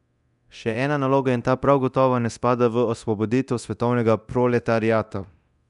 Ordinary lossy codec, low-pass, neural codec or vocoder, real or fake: none; 10.8 kHz; codec, 24 kHz, 0.9 kbps, DualCodec; fake